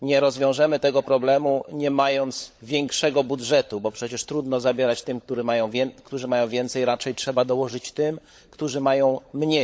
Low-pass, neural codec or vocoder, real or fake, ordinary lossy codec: none; codec, 16 kHz, 16 kbps, FunCodec, trained on LibriTTS, 50 frames a second; fake; none